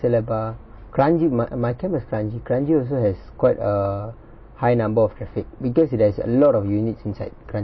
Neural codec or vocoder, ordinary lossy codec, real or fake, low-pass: none; MP3, 24 kbps; real; 7.2 kHz